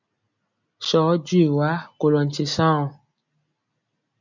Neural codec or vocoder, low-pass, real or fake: none; 7.2 kHz; real